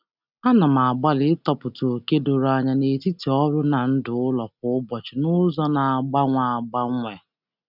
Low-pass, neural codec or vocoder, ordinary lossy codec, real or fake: 5.4 kHz; none; none; real